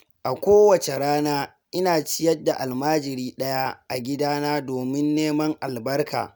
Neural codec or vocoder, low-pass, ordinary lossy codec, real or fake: none; none; none; real